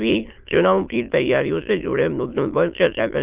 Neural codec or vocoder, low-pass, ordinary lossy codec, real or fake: autoencoder, 22.05 kHz, a latent of 192 numbers a frame, VITS, trained on many speakers; 3.6 kHz; Opus, 32 kbps; fake